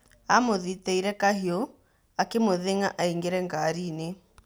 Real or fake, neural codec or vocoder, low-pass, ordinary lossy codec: real; none; none; none